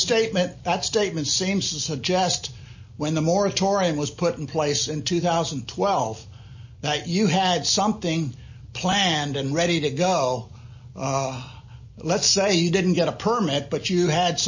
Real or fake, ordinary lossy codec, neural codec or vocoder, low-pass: real; MP3, 32 kbps; none; 7.2 kHz